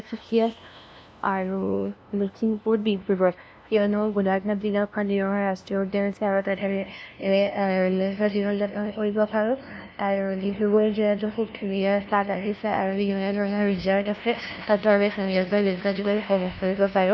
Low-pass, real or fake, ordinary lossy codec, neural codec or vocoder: none; fake; none; codec, 16 kHz, 0.5 kbps, FunCodec, trained on LibriTTS, 25 frames a second